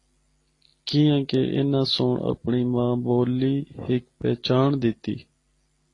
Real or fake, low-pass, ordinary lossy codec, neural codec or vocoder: real; 10.8 kHz; AAC, 32 kbps; none